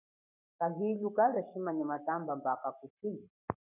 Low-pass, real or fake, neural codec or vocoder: 3.6 kHz; fake; codec, 44.1 kHz, 7.8 kbps, Pupu-Codec